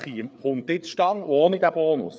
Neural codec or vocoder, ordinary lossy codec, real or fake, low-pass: codec, 16 kHz, 4 kbps, FreqCodec, larger model; none; fake; none